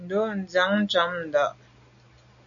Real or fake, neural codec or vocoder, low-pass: real; none; 7.2 kHz